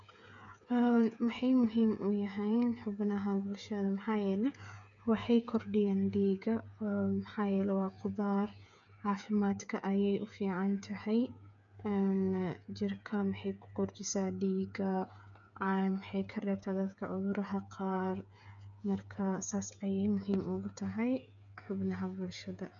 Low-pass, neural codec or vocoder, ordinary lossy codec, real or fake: 7.2 kHz; codec, 16 kHz, 8 kbps, FreqCodec, smaller model; none; fake